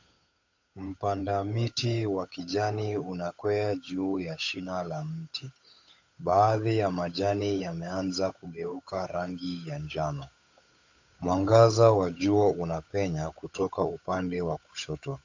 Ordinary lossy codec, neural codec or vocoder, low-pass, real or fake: AAC, 48 kbps; codec, 16 kHz, 8 kbps, FunCodec, trained on Chinese and English, 25 frames a second; 7.2 kHz; fake